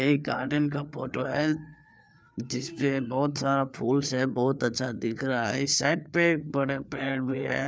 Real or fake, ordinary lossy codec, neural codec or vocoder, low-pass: fake; none; codec, 16 kHz, 4 kbps, FreqCodec, larger model; none